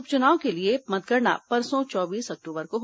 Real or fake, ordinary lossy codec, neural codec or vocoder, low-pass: real; none; none; none